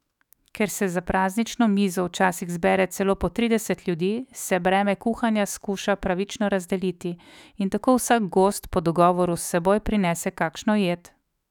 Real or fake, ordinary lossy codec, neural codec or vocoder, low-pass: fake; none; autoencoder, 48 kHz, 128 numbers a frame, DAC-VAE, trained on Japanese speech; 19.8 kHz